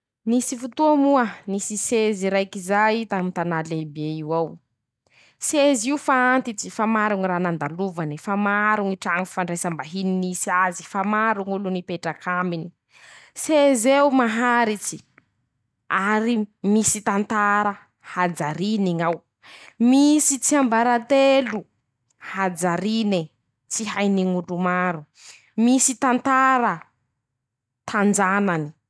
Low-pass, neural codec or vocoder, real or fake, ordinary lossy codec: none; none; real; none